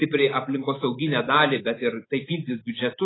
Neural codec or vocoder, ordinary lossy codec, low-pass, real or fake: none; AAC, 16 kbps; 7.2 kHz; real